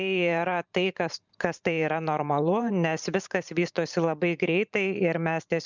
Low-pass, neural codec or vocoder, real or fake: 7.2 kHz; vocoder, 44.1 kHz, 128 mel bands every 512 samples, BigVGAN v2; fake